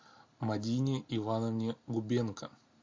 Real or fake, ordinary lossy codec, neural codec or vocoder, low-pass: real; MP3, 48 kbps; none; 7.2 kHz